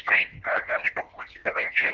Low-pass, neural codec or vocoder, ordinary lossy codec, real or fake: 7.2 kHz; codec, 24 kHz, 1.5 kbps, HILCodec; Opus, 32 kbps; fake